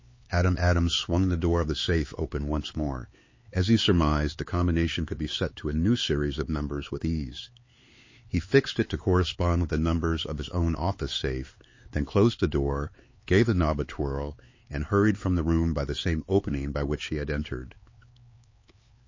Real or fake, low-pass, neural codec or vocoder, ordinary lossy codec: fake; 7.2 kHz; codec, 16 kHz, 4 kbps, X-Codec, HuBERT features, trained on LibriSpeech; MP3, 32 kbps